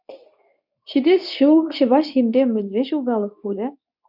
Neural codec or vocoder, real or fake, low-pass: codec, 24 kHz, 0.9 kbps, WavTokenizer, medium speech release version 2; fake; 5.4 kHz